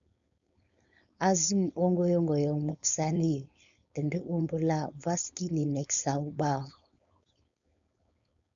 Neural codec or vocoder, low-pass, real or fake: codec, 16 kHz, 4.8 kbps, FACodec; 7.2 kHz; fake